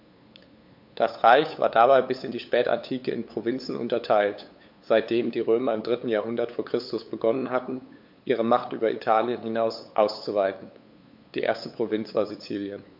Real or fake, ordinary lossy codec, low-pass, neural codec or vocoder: fake; MP3, 48 kbps; 5.4 kHz; codec, 16 kHz, 8 kbps, FunCodec, trained on LibriTTS, 25 frames a second